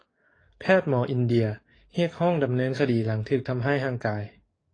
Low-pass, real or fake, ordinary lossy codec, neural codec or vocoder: 9.9 kHz; fake; AAC, 32 kbps; codec, 44.1 kHz, 7.8 kbps, DAC